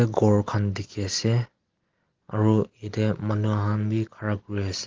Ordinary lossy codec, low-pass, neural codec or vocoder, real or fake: Opus, 16 kbps; 7.2 kHz; none; real